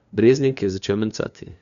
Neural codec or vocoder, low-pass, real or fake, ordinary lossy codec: codec, 16 kHz, 2 kbps, FunCodec, trained on LibriTTS, 25 frames a second; 7.2 kHz; fake; none